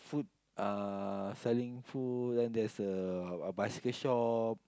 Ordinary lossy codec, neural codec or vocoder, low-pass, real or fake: none; none; none; real